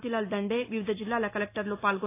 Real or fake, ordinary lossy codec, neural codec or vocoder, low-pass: real; AAC, 24 kbps; none; 3.6 kHz